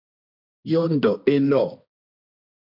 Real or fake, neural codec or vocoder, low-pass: fake; codec, 16 kHz, 1.1 kbps, Voila-Tokenizer; 5.4 kHz